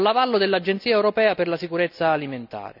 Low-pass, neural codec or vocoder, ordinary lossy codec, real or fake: 5.4 kHz; none; none; real